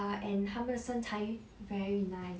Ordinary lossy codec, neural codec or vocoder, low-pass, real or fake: none; none; none; real